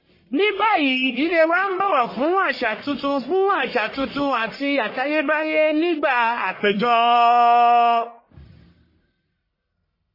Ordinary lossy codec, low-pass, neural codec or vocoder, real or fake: MP3, 24 kbps; 5.4 kHz; codec, 44.1 kHz, 1.7 kbps, Pupu-Codec; fake